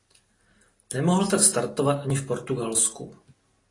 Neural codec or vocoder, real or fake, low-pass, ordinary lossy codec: none; real; 10.8 kHz; AAC, 32 kbps